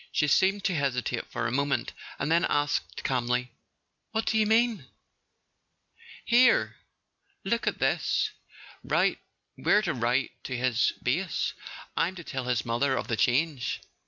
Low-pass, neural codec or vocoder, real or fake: 7.2 kHz; none; real